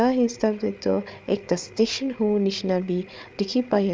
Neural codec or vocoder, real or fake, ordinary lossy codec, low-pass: codec, 16 kHz, 8 kbps, FreqCodec, larger model; fake; none; none